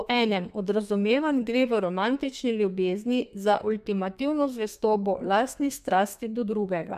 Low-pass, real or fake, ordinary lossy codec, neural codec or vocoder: 14.4 kHz; fake; none; codec, 32 kHz, 1.9 kbps, SNAC